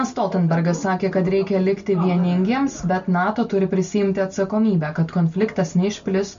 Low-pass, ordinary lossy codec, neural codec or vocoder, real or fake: 7.2 kHz; MP3, 64 kbps; none; real